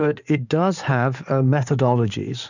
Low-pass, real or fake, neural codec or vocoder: 7.2 kHz; fake; codec, 16 kHz in and 24 kHz out, 2.2 kbps, FireRedTTS-2 codec